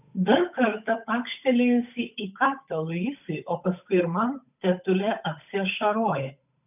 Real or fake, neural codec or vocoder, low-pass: fake; codec, 16 kHz, 8 kbps, FunCodec, trained on Chinese and English, 25 frames a second; 3.6 kHz